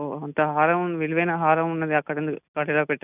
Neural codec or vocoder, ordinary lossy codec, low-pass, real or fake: none; none; 3.6 kHz; real